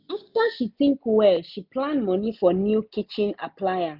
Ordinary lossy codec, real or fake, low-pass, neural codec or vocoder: none; real; 5.4 kHz; none